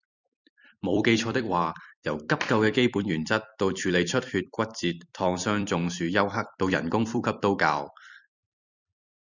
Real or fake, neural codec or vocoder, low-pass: fake; vocoder, 44.1 kHz, 128 mel bands every 256 samples, BigVGAN v2; 7.2 kHz